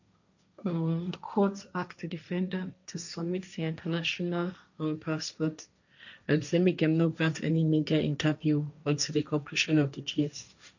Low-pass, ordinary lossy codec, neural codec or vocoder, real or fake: 7.2 kHz; none; codec, 16 kHz, 1.1 kbps, Voila-Tokenizer; fake